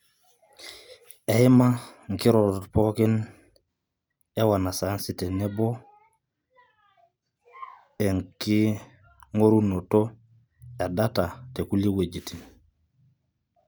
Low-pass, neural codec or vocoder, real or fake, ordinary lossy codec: none; none; real; none